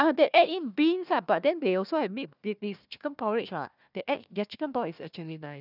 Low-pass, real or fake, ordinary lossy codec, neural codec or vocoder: 5.4 kHz; fake; none; codec, 16 kHz, 1 kbps, FunCodec, trained on Chinese and English, 50 frames a second